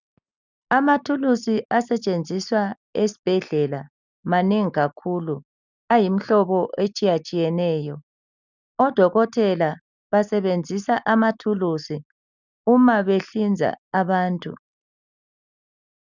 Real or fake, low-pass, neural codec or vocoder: real; 7.2 kHz; none